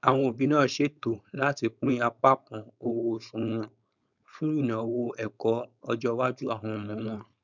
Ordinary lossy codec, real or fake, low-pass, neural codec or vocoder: none; fake; 7.2 kHz; codec, 16 kHz, 4.8 kbps, FACodec